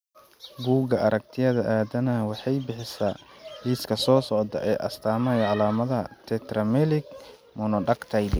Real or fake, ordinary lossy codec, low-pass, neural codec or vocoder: real; none; none; none